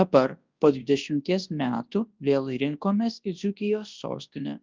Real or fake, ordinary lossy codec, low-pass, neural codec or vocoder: fake; Opus, 32 kbps; 7.2 kHz; codec, 24 kHz, 0.9 kbps, WavTokenizer, large speech release